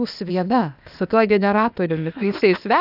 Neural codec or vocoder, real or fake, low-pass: codec, 16 kHz, 0.8 kbps, ZipCodec; fake; 5.4 kHz